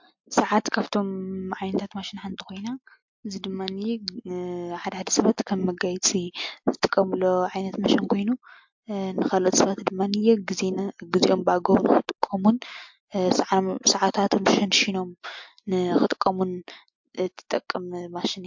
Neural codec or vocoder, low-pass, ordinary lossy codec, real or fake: none; 7.2 kHz; MP3, 48 kbps; real